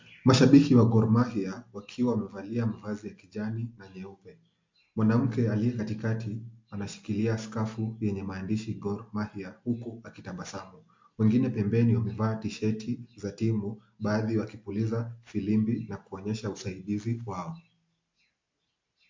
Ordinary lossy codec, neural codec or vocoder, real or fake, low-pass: MP3, 48 kbps; none; real; 7.2 kHz